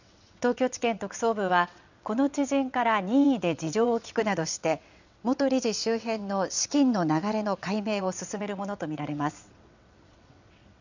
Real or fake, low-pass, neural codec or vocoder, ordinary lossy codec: fake; 7.2 kHz; vocoder, 22.05 kHz, 80 mel bands, WaveNeXt; none